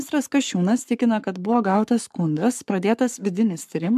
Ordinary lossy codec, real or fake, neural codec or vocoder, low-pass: MP3, 96 kbps; fake; codec, 44.1 kHz, 7.8 kbps, Pupu-Codec; 14.4 kHz